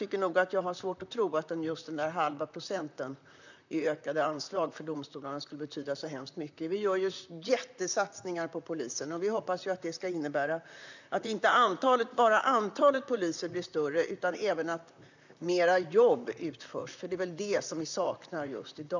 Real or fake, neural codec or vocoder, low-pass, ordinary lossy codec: fake; vocoder, 44.1 kHz, 128 mel bands, Pupu-Vocoder; 7.2 kHz; none